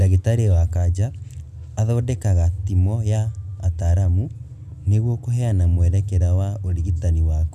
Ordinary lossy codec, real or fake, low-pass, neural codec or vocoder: none; real; 14.4 kHz; none